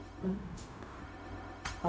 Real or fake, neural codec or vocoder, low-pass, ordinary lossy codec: fake; codec, 16 kHz, 0.4 kbps, LongCat-Audio-Codec; none; none